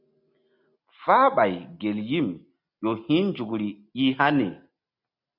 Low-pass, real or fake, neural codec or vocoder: 5.4 kHz; real; none